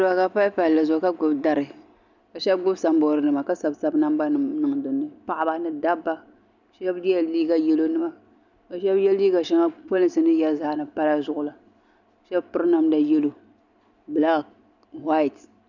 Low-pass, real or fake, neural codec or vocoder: 7.2 kHz; real; none